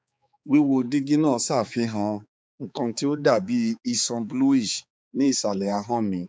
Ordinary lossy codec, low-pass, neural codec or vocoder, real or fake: none; none; codec, 16 kHz, 4 kbps, X-Codec, HuBERT features, trained on balanced general audio; fake